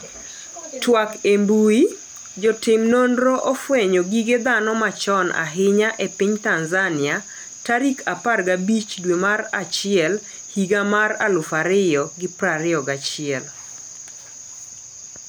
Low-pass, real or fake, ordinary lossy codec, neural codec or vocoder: none; real; none; none